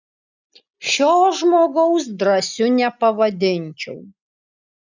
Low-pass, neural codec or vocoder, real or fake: 7.2 kHz; none; real